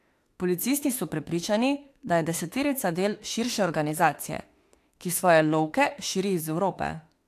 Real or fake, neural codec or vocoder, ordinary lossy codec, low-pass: fake; autoencoder, 48 kHz, 32 numbers a frame, DAC-VAE, trained on Japanese speech; AAC, 64 kbps; 14.4 kHz